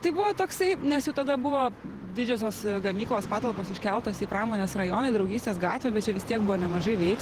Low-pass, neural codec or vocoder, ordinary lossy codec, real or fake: 14.4 kHz; vocoder, 48 kHz, 128 mel bands, Vocos; Opus, 16 kbps; fake